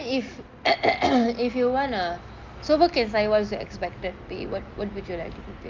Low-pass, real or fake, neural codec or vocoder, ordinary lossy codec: 7.2 kHz; real; none; Opus, 32 kbps